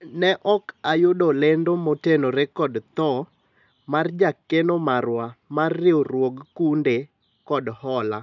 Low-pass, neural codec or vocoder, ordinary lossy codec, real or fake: 7.2 kHz; none; none; real